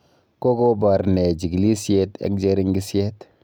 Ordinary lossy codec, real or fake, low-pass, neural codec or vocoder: none; real; none; none